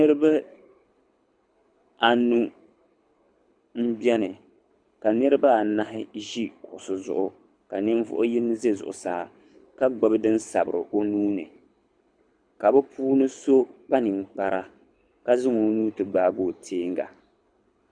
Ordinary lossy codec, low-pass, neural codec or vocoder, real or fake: Opus, 64 kbps; 9.9 kHz; codec, 24 kHz, 6 kbps, HILCodec; fake